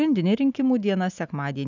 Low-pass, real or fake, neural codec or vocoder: 7.2 kHz; real; none